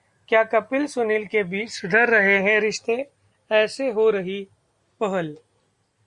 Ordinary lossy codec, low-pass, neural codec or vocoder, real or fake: Opus, 64 kbps; 10.8 kHz; none; real